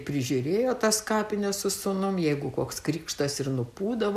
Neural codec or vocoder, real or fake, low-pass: none; real; 14.4 kHz